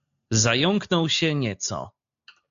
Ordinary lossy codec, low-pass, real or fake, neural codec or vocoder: AAC, 64 kbps; 7.2 kHz; real; none